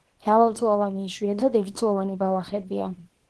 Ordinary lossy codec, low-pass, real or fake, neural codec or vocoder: Opus, 16 kbps; 10.8 kHz; fake; codec, 24 kHz, 0.9 kbps, WavTokenizer, small release